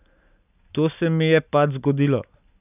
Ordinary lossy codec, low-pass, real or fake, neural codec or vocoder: none; 3.6 kHz; real; none